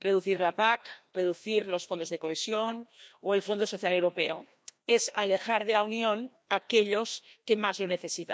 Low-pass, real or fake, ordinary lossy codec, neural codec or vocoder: none; fake; none; codec, 16 kHz, 1 kbps, FreqCodec, larger model